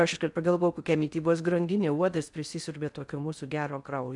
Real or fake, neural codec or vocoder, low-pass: fake; codec, 16 kHz in and 24 kHz out, 0.6 kbps, FocalCodec, streaming, 4096 codes; 10.8 kHz